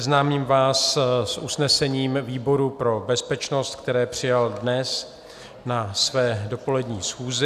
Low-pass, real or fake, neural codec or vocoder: 14.4 kHz; real; none